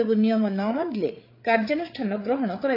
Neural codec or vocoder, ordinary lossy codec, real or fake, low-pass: codec, 16 kHz, 8 kbps, FreqCodec, larger model; AAC, 32 kbps; fake; 5.4 kHz